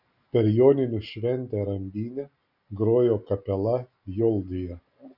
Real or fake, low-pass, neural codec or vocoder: real; 5.4 kHz; none